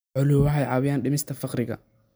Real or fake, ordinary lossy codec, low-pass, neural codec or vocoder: real; none; none; none